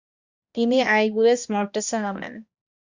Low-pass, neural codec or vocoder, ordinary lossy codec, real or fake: 7.2 kHz; codec, 16 kHz, 1 kbps, X-Codec, HuBERT features, trained on balanced general audio; Opus, 64 kbps; fake